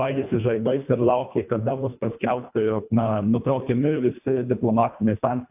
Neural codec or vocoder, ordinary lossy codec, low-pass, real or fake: codec, 24 kHz, 1.5 kbps, HILCodec; AAC, 32 kbps; 3.6 kHz; fake